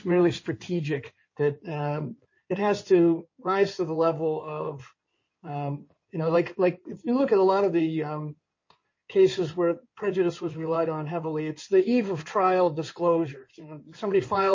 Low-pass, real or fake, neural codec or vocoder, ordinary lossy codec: 7.2 kHz; fake; codec, 16 kHz in and 24 kHz out, 2.2 kbps, FireRedTTS-2 codec; MP3, 32 kbps